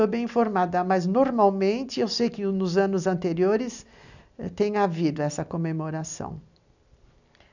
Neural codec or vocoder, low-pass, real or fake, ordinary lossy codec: none; 7.2 kHz; real; none